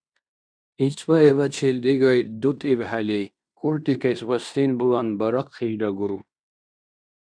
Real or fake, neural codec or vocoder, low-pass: fake; codec, 16 kHz in and 24 kHz out, 0.9 kbps, LongCat-Audio-Codec, fine tuned four codebook decoder; 9.9 kHz